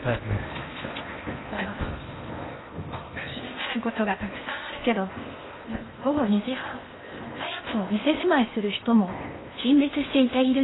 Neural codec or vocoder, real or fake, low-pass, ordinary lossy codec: codec, 16 kHz in and 24 kHz out, 0.8 kbps, FocalCodec, streaming, 65536 codes; fake; 7.2 kHz; AAC, 16 kbps